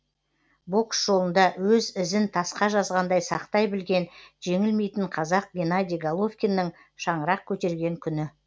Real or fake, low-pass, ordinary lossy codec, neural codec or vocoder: real; none; none; none